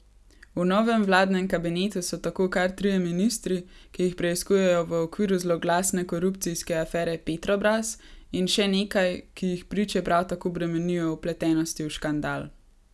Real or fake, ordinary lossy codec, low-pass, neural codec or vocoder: real; none; none; none